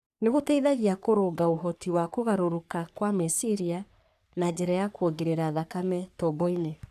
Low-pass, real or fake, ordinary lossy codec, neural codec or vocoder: 14.4 kHz; fake; none; codec, 44.1 kHz, 3.4 kbps, Pupu-Codec